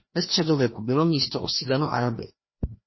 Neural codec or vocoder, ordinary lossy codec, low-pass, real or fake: codec, 16 kHz, 1 kbps, FreqCodec, larger model; MP3, 24 kbps; 7.2 kHz; fake